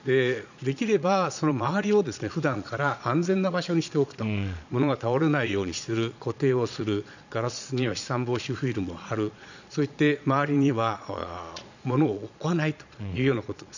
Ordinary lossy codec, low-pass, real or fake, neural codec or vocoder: none; 7.2 kHz; fake; vocoder, 22.05 kHz, 80 mel bands, Vocos